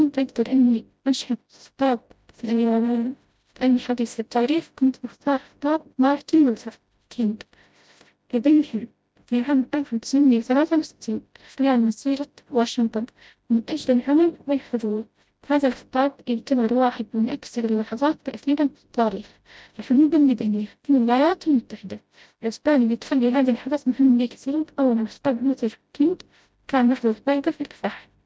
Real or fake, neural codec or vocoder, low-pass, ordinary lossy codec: fake; codec, 16 kHz, 0.5 kbps, FreqCodec, smaller model; none; none